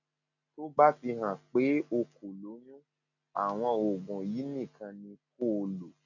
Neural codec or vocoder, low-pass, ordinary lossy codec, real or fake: none; 7.2 kHz; none; real